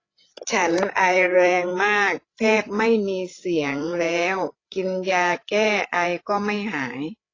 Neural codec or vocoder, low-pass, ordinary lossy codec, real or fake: codec, 16 kHz, 8 kbps, FreqCodec, larger model; 7.2 kHz; AAC, 32 kbps; fake